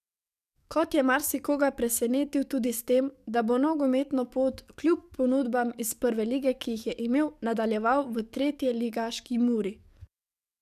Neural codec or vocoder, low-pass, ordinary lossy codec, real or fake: codec, 44.1 kHz, 7.8 kbps, DAC; 14.4 kHz; none; fake